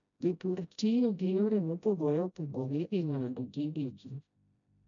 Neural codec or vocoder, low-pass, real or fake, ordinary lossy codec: codec, 16 kHz, 0.5 kbps, FreqCodec, smaller model; 7.2 kHz; fake; none